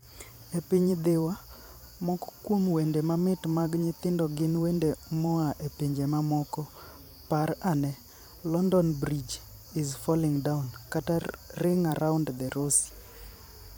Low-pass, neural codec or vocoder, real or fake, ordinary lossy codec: none; vocoder, 44.1 kHz, 128 mel bands every 512 samples, BigVGAN v2; fake; none